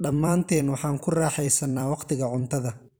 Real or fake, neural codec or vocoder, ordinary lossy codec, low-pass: fake; vocoder, 44.1 kHz, 128 mel bands every 256 samples, BigVGAN v2; none; none